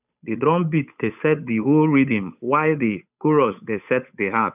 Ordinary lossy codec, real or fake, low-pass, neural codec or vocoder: none; fake; 3.6 kHz; codec, 16 kHz, 8 kbps, FunCodec, trained on Chinese and English, 25 frames a second